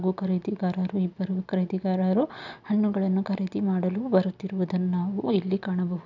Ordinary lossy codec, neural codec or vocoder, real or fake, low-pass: none; none; real; 7.2 kHz